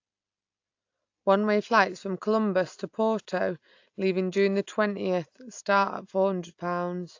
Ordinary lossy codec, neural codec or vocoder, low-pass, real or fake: AAC, 48 kbps; none; 7.2 kHz; real